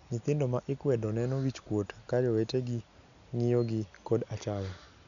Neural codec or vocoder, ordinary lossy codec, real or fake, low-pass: none; none; real; 7.2 kHz